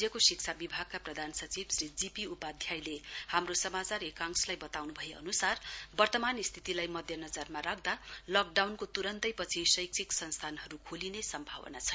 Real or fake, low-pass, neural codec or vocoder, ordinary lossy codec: real; none; none; none